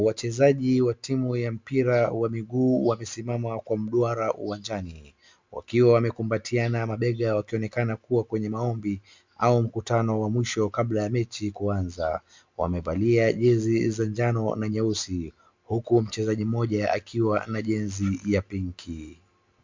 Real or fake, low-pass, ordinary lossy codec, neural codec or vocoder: real; 7.2 kHz; MP3, 64 kbps; none